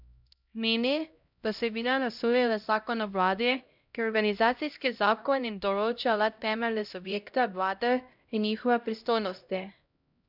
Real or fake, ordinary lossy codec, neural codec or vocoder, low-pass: fake; none; codec, 16 kHz, 0.5 kbps, X-Codec, HuBERT features, trained on LibriSpeech; 5.4 kHz